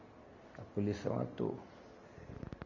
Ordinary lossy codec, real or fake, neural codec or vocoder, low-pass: none; real; none; 7.2 kHz